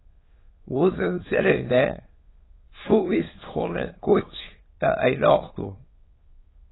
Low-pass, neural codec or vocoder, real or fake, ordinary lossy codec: 7.2 kHz; autoencoder, 22.05 kHz, a latent of 192 numbers a frame, VITS, trained on many speakers; fake; AAC, 16 kbps